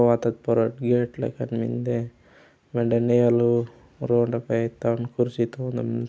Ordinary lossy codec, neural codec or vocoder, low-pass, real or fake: none; none; none; real